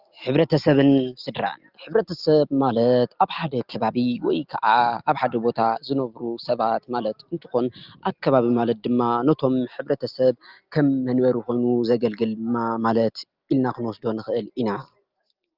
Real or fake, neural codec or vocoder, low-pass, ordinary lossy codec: real; none; 5.4 kHz; Opus, 32 kbps